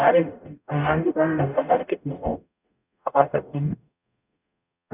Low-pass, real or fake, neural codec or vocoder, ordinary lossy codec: 3.6 kHz; fake; codec, 44.1 kHz, 0.9 kbps, DAC; none